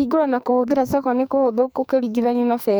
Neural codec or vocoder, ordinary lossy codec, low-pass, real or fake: codec, 44.1 kHz, 2.6 kbps, SNAC; none; none; fake